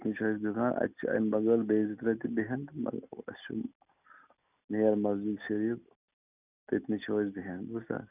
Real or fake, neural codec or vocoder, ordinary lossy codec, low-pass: real; none; none; 3.6 kHz